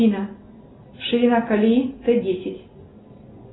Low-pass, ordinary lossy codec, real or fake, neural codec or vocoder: 7.2 kHz; AAC, 16 kbps; real; none